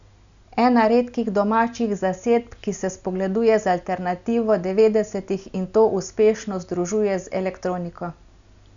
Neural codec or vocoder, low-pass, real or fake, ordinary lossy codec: none; 7.2 kHz; real; none